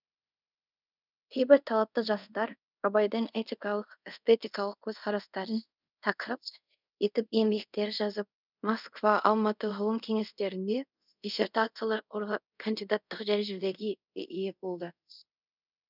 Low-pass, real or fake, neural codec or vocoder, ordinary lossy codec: 5.4 kHz; fake; codec, 24 kHz, 0.5 kbps, DualCodec; none